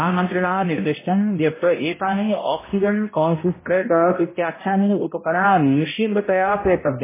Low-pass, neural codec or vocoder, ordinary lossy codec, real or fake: 3.6 kHz; codec, 16 kHz, 0.5 kbps, X-Codec, HuBERT features, trained on balanced general audio; MP3, 16 kbps; fake